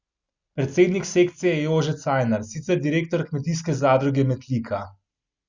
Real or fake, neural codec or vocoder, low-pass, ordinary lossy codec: real; none; 7.2 kHz; Opus, 64 kbps